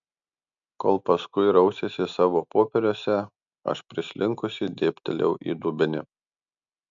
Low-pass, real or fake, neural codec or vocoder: 7.2 kHz; real; none